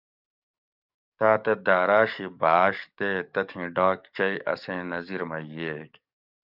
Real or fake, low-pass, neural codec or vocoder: fake; 5.4 kHz; codec, 44.1 kHz, 7.8 kbps, DAC